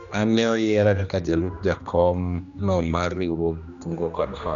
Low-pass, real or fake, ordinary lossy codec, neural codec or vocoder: 7.2 kHz; fake; none; codec, 16 kHz, 1 kbps, X-Codec, HuBERT features, trained on general audio